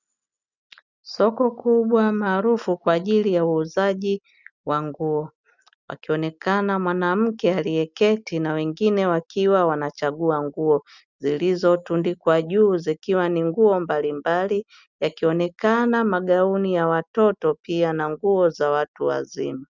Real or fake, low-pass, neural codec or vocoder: real; 7.2 kHz; none